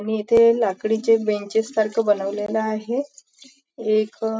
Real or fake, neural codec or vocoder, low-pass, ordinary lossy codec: real; none; none; none